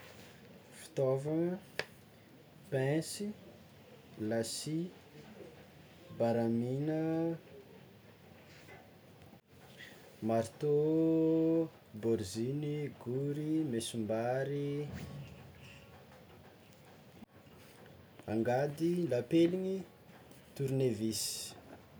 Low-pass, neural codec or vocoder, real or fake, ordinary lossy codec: none; none; real; none